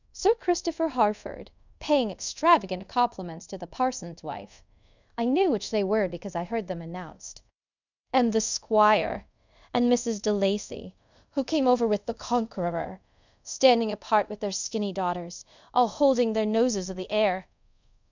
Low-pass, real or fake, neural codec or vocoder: 7.2 kHz; fake; codec, 24 kHz, 0.5 kbps, DualCodec